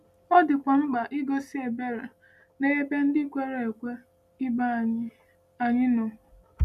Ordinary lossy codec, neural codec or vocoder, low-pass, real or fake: none; none; 14.4 kHz; real